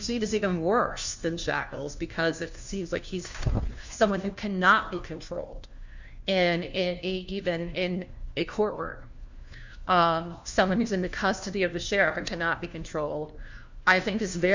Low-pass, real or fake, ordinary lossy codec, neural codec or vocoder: 7.2 kHz; fake; Opus, 64 kbps; codec, 16 kHz, 1 kbps, FunCodec, trained on LibriTTS, 50 frames a second